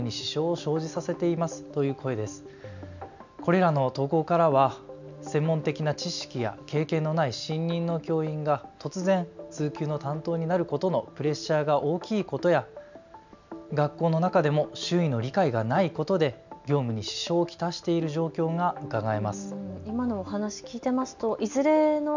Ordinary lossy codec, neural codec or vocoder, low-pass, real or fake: none; none; 7.2 kHz; real